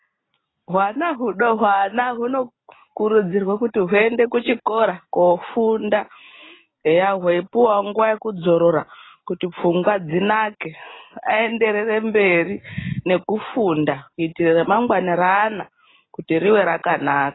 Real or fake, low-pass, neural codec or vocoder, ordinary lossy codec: real; 7.2 kHz; none; AAC, 16 kbps